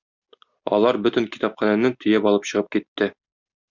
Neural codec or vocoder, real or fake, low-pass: none; real; 7.2 kHz